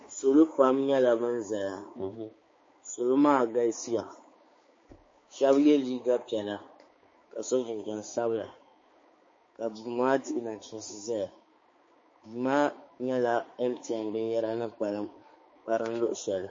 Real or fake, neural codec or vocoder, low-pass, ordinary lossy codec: fake; codec, 16 kHz, 4 kbps, X-Codec, HuBERT features, trained on balanced general audio; 7.2 kHz; MP3, 32 kbps